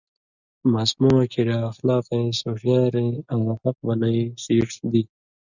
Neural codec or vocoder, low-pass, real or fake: none; 7.2 kHz; real